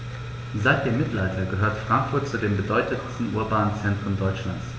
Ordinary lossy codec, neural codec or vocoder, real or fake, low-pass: none; none; real; none